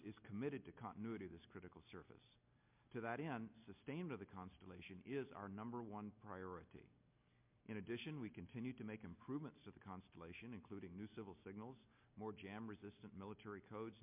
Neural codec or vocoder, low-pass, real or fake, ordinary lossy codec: none; 3.6 kHz; real; MP3, 32 kbps